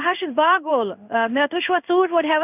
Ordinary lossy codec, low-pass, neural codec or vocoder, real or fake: none; 3.6 kHz; codec, 16 kHz in and 24 kHz out, 1 kbps, XY-Tokenizer; fake